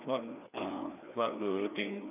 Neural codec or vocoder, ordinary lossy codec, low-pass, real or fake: codec, 16 kHz, 2 kbps, FreqCodec, larger model; none; 3.6 kHz; fake